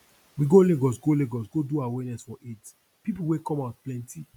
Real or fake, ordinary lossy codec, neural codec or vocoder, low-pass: real; none; none; 19.8 kHz